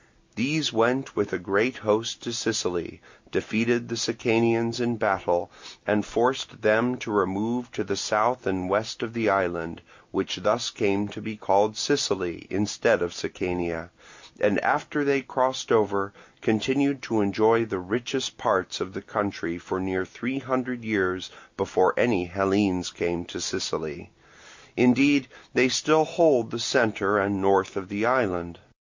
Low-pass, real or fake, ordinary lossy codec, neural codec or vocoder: 7.2 kHz; real; MP3, 64 kbps; none